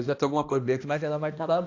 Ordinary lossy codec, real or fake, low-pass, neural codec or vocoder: AAC, 48 kbps; fake; 7.2 kHz; codec, 16 kHz, 1 kbps, X-Codec, HuBERT features, trained on balanced general audio